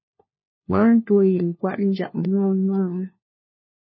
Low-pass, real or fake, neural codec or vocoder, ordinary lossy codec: 7.2 kHz; fake; codec, 16 kHz, 1 kbps, FunCodec, trained on LibriTTS, 50 frames a second; MP3, 24 kbps